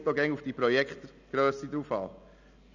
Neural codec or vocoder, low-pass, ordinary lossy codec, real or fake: none; 7.2 kHz; none; real